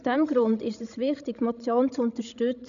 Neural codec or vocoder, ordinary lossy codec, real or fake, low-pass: codec, 16 kHz, 16 kbps, FreqCodec, larger model; none; fake; 7.2 kHz